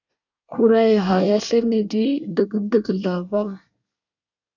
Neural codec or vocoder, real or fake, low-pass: codec, 24 kHz, 1 kbps, SNAC; fake; 7.2 kHz